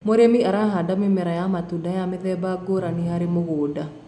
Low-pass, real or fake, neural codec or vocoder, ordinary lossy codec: 10.8 kHz; real; none; none